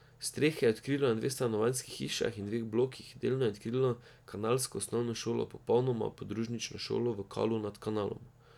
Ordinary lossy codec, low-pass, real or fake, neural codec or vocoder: none; 19.8 kHz; real; none